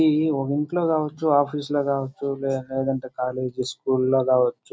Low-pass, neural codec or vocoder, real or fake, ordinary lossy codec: none; none; real; none